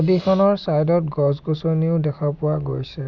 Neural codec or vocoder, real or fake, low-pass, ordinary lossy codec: none; real; 7.2 kHz; none